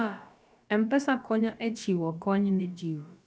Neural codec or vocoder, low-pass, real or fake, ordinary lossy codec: codec, 16 kHz, about 1 kbps, DyCAST, with the encoder's durations; none; fake; none